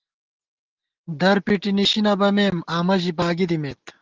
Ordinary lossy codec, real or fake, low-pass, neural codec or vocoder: Opus, 16 kbps; real; 7.2 kHz; none